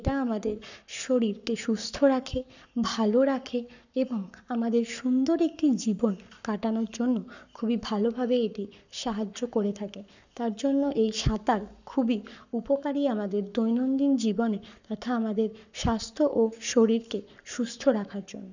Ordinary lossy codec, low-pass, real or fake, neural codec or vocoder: none; 7.2 kHz; fake; codec, 44.1 kHz, 7.8 kbps, Pupu-Codec